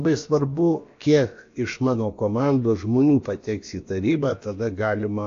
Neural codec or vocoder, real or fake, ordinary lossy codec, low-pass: codec, 16 kHz, about 1 kbps, DyCAST, with the encoder's durations; fake; AAC, 48 kbps; 7.2 kHz